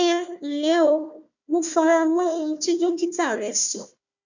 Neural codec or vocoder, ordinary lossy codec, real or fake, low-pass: codec, 16 kHz, 1 kbps, FunCodec, trained on Chinese and English, 50 frames a second; none; fake; 7.2 kHz